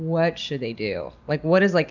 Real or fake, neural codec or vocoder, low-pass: real; none; 7.2 kHz